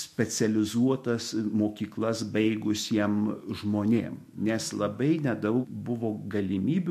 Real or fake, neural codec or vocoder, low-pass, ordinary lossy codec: fake; autoencoder, 48 kHz, 128 numbers a frame, DAC-VAE, trained on Japanese speech; 14.4 kHz; MP3, 64 kbps